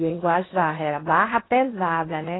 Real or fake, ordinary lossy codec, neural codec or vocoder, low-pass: fake; AAC, 16 kbps; codec, 16 kHz in and 24 kHz out, 0.8 kbps, FocalCodec, streaming, 65536 codes; 7.2 kHz